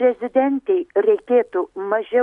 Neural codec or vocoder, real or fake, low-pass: none; real; 10.8 kHz